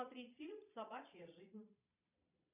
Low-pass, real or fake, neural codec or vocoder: 3.6 kHz; fake; vocoder, 22.05 kHz, 80 mel bands, Vocos